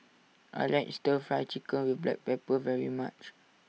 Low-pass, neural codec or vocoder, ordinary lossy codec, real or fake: none; none; none; real